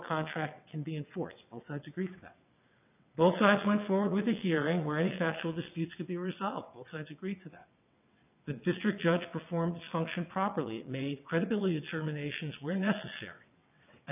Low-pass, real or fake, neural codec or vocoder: 3.6 kHz; fake; vocoder, 22.05 kHz, 80 mel bands, WaveNeXt